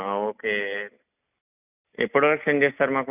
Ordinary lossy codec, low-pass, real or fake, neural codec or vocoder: none; 3.6 kHz; real; none